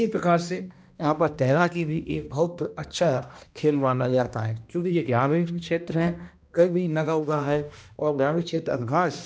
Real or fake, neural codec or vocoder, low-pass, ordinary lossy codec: fake; codec, 16 kHz, 1 kbps, X-Codec, HuBERT features, trained on balanced general audio; none; none